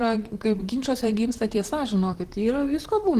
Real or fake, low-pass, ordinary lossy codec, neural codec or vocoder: fake; 14.4 kHz; Opus, 16 kbps; vocoder, 44.1 kHz, 128 mel bands, Pupu-Vocoder